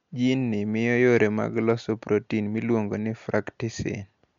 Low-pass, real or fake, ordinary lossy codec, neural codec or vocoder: 7.2 kHz; real; MP3, 64 kbps; none